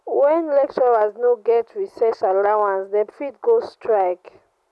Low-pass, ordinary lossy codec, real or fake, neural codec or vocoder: none; none; real; none